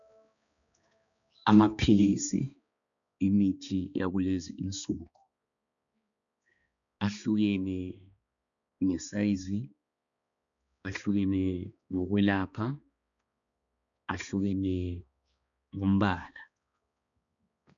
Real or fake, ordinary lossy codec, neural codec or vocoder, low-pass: fake; MP3, 96 kbps; codec, 16 kHz, 2 kbps, X-Codec, HuBERT features, trained on balanced general audio; 7.2 kHz